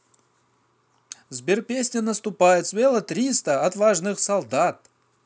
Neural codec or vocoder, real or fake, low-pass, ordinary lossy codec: none; real; none; none